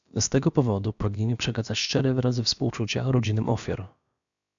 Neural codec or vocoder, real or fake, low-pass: codec, 16 kHz, about 1 kbps, DyCAST, with the encoder's durations; fake; 7.2 kHz